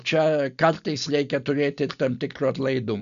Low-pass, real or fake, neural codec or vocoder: 7.2 kHz; real; none